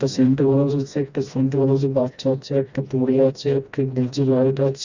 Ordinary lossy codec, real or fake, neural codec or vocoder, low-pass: Opus, 64 kbps; fake; codec, 16 kHz, 1 kbps, FreqCodec, smaller model; 7.2 kHz